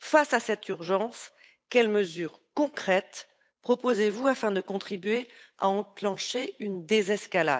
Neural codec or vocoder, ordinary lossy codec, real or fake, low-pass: codec, 16 kHz, 8 kbps, FunCodec, trained on Chinese and English, 25 frames a second; none; fake; none